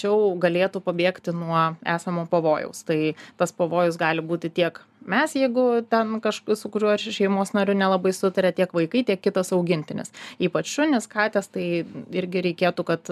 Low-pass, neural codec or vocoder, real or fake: 14.4 kHz; none; real